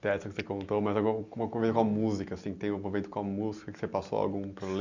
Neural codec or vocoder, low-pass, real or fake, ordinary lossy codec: none; 7.2 kHz; real; none